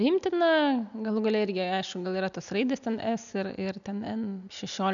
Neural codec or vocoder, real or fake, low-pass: none; real; 7.2 kHz